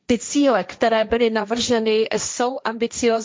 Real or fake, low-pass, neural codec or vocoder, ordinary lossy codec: fake; none; codec, 16 kHz, 1.1 kbps, Voila-Tokenizer; none